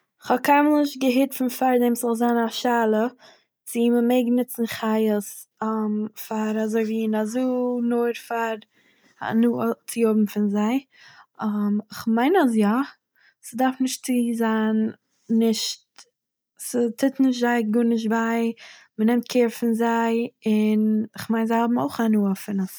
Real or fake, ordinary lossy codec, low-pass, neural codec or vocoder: real; none; none; none